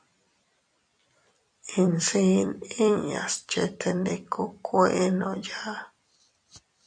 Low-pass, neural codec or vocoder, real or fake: 9.9 kHz; vocoder, 44.1 kHz, 128 mel bands every 256 samples, BigVGAN v2; fake